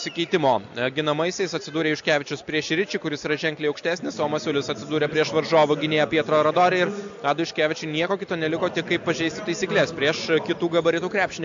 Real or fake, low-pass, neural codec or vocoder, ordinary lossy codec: real; 7.2 kHz; none; AAC, 64 kbps